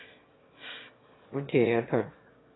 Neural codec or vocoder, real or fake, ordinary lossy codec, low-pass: autoencoder, 22.05 kHz, a latent of 192 numbers a frame, VITS, trained on one speaker; fake; AAC, 16 kbps; 7.2 kHz